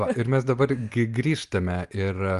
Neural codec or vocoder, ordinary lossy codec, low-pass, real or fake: none; Opus, 32 kbps; 10.8 kHz; real